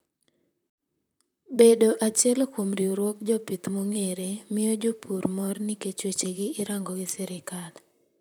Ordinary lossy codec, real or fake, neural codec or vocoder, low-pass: none; fake; vocoder, 44.1 kHz, 128 mel bands, Pupu-Vocoder; none